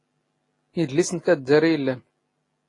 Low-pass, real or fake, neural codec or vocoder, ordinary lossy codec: 10.8 kHz; real; none; AAC, 32 kbps